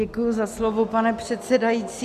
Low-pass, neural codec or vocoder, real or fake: 14.4 kHz; none; real